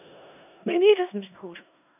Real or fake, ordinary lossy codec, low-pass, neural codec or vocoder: fake; none; 3.6 kHz; codec, 16 kHz in and 24 kHz out, 0.4 kbps, LongCat-Audio-Codec, four codebook decoder